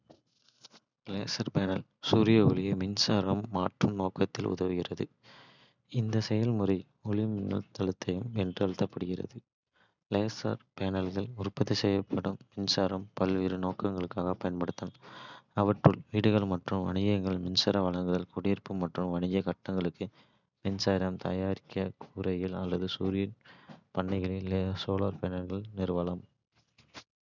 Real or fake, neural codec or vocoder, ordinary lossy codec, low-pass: real; none; none; 7.2 kHz